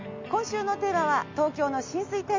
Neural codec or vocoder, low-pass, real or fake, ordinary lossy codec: none; 7.2 kHz; real; MP3, 64 kbps